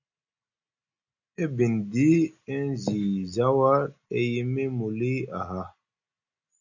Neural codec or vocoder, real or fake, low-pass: none; real; 7.2 kHz